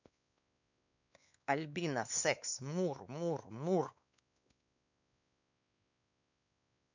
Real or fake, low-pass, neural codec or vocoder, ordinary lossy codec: fake; 7.2 kHz; codec, 16 kHz, 2 kbps, X-Codec, WavLM features, trained on Multilingual LibriSpeech; none